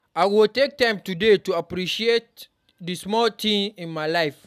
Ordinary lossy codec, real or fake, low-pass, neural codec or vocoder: none; real; 14.4 kHz; none